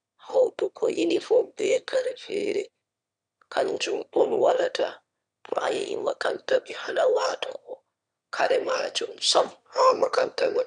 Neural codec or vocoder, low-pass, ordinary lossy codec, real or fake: autoencoder, 22.05 kHz, a latent of 192 numbers a frame, VITS, trained on one speaker; 9.9 kHz; none; fake